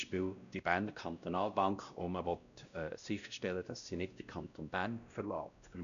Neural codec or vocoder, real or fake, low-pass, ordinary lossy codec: codec, 16 kHz, 1 kbps, X-Codec, WavLM features, trained on Multilingual LibriSpeech; fake; 7.2 kHz; none